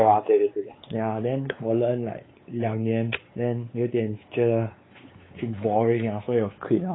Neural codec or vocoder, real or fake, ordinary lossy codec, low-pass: codec, 16 kHz, 4 kbps, X-Codec, WavLM features, trained on Multilingual LibriSpeech; fake; AAC, 16 kbps; 7.2 kHz